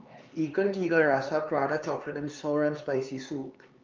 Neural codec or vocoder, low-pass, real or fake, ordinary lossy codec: codec, 16 kHz, 4 kbps, X-Codec, HuBERT features, trained on LibriSpeech; 7.2 kHz; fake; Opus, 24 kbps